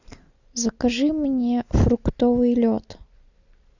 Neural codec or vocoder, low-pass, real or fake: none; 7.2 kHz; real